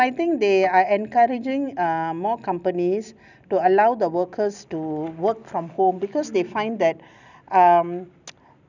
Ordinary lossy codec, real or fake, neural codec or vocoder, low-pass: none; real; none; 7.2 kHz